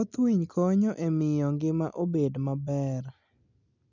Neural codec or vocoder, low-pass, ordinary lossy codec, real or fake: none; 7.2 kHz; none; real